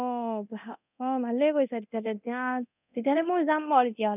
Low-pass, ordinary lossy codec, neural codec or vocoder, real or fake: 3.6 kHz; none; codec, 24 kHz, 0.9 kbps, DualCodec; fake